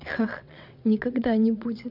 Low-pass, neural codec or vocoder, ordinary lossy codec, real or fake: 5.4 kHz; vocoder, 22.05 kHz, 80 mel bands, WaveNeXt; none; fake